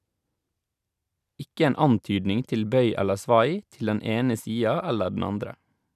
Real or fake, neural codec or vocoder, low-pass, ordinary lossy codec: real; none; 14.4 kHz; none